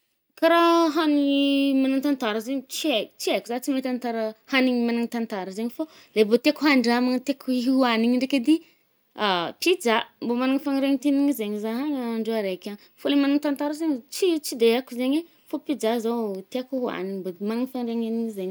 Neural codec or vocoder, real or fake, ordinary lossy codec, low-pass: none; real; none; none